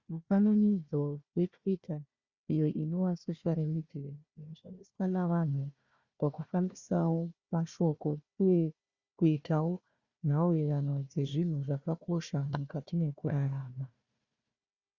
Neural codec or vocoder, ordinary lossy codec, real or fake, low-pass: codec, 16 kHz, 1 kbps, FunCodec, trained on Chinese and English, 50 frames a second; Opus, 64 kbps; fake; 7.2 kHz